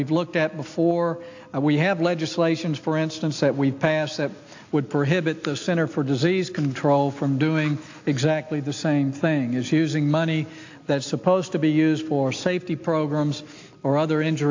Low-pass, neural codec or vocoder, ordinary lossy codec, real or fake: 7.2 kHz; none; AAC, 48 kbps; real